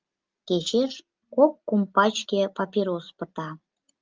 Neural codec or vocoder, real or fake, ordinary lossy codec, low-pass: none; real; Opus, 32 kbps; 7.2 kHz